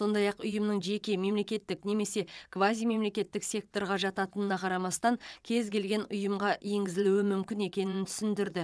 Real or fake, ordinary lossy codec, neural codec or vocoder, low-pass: fake; none; vocoder, 22.05 kHz, 80 mel bands, WaveNeXt; none